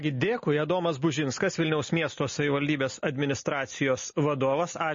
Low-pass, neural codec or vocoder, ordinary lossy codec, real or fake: 7.2 kHz; none; MP3, 32 kbps; real